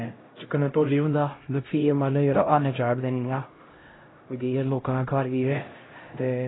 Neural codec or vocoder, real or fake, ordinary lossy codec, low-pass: codec, 16 kHz, 0.5 kbps, X-Codec, HuBERT features, trained on LibriSpeech; fake; AAC, 16 kbps; 7.2 kHz